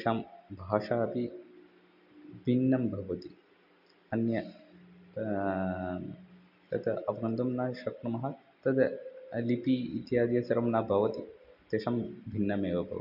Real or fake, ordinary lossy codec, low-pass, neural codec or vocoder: real; none; 5.4 kHz; none